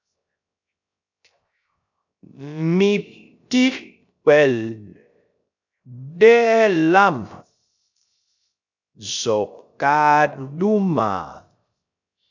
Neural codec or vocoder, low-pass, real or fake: codec, 16 kHz, 0.3 kbps, FocalCodec; 7.2 kHz; fake